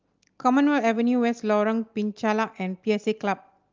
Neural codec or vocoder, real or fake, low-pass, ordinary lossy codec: none; real; 7.2 kHz; Opus, 32 kbps